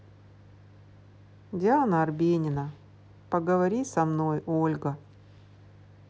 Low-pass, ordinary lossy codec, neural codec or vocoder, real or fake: none; none; none; real